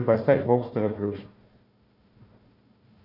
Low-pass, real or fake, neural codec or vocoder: 5.4 kHz; fake; codec, 16 kHz, 1 kbps, FunCodec, trained on Chinese and English, 50 frames a second